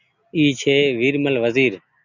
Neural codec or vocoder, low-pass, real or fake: none; 7.2 kHz; real